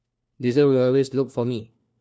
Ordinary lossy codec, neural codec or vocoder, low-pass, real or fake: none; codec, 16 kHz, 1 kbps, FunCodec, trained on LibriTTS, 50 frames a second; none; fake